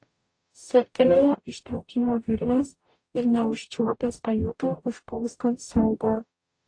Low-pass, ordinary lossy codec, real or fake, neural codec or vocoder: 9.9 kHz; AAC, 48 kbps; fake; codec, 44.1 kHz, 0.9 kbps, DAC